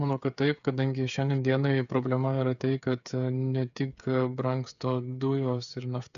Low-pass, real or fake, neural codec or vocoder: 7.2 kHz; fake; codec, 16 kHz, 8 kbps, FreqCodec, smaller model